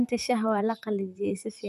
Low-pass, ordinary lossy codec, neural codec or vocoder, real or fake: 14.4 kHz; none; vocoder, 44.1 kHz, 128 mel bands, Pupu-Vocoder; fake